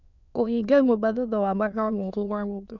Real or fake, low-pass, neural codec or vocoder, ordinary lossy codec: fake; 7.2 kHz; autoencoder, 22.05 kHz, a latent of 192 numbers a frame, VITS, trained on many speakers; none